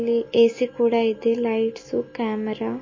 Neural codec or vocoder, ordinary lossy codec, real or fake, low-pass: none; MP3, 32 kbps; real; 7.2 kHz